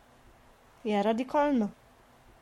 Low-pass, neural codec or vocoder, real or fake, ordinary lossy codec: 19.8 kHz; codec, 44.1 kHz, 7.8 kbps, Pupu-Codec; fake; MP3, 64 kbps